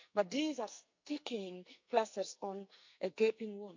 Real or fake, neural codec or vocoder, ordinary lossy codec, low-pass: fake; codec, 44.1 kHz, 2.6 kbps, SNAC; MP3, 64 kbps; 7.2 kHz